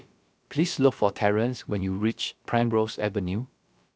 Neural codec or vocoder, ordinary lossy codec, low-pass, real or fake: codec, 16 kHz, about 1 kbps, DyCAST, with the encoder's durations; none; none; fake